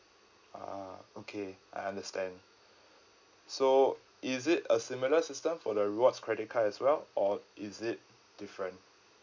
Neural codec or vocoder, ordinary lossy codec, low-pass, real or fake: none; none; 7.2 kHz; real